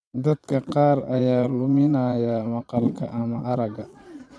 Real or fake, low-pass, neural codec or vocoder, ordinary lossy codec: fake; none; vocoder, 22.05 kHz, 80 mel bands, Vocos; none